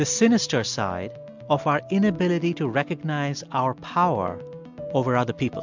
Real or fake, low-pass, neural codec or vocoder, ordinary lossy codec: real; 7.2 kHz; none; MP3, 64 kbps